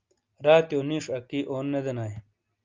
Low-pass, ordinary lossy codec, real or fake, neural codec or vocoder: 7.2 kHz; Opus, 24 kbps; real; none